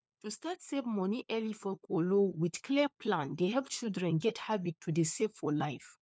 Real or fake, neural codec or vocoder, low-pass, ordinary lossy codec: fake; codec, 16 kHz, 4 kbps, FunCodec, trained on LibriTTS, 50 frames a second; none; none